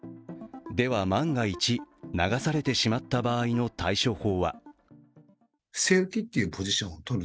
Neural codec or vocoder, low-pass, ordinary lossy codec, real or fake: none; none; none; real